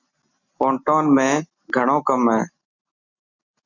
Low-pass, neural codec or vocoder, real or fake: 7.2 kHz; none; real